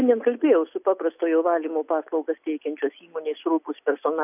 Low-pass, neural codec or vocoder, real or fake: 3.6 kHz; none; real